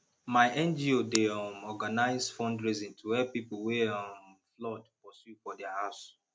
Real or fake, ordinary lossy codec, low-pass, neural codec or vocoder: real; none; none; none